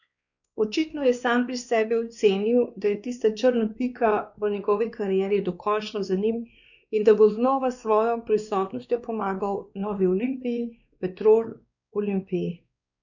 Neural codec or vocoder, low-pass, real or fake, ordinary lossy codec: codec, 16 kHz, 2 kbps, X-Codec, WavLM features, trained on Multilingual LibriSpeech; 7.2 kHz; fake; none